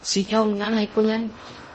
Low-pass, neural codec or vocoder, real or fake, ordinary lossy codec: 10.8 kHz; codec, 16 kHz in and 24 kHz out, 0.6 kbps, FocalCodec, streaming, 2048 codes; fake; MP3, 32 kbps